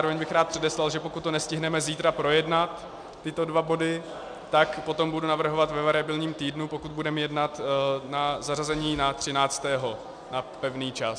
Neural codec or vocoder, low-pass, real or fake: none; 9.9 kHz; real